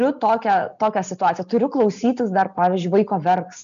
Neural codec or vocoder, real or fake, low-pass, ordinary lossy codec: none; real; 7.2 kHz; MP3, 96 kbps